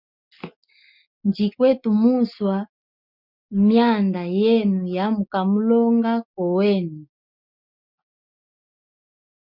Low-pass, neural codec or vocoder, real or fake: 5.4 kHz; none; real